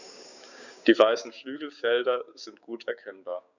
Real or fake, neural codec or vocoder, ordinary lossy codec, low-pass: fake; codec, 16 kHz, 6 kbps, DAC; none; 7.2 kHz